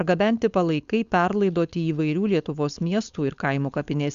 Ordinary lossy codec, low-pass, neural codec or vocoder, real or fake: Opus, 64 kbps; 7.2 kHz; codec, 16 kHz, 4.8 kbps, FACodec; fake